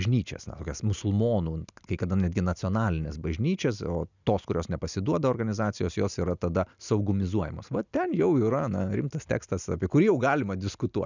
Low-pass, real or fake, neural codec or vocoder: 7.2 kHz; real; none